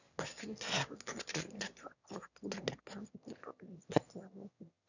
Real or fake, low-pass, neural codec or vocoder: fake; 7.2 kHz; autoencoder, 22.05 kHz, a latent of 192 numbers a frame, VITS, trained on one speaker